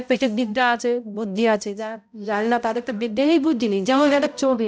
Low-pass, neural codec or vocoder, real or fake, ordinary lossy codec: none; codec, 16 kHz, 0.5 kbps, X-Codec, HuBERT features, trained on balanced general audio; fake; none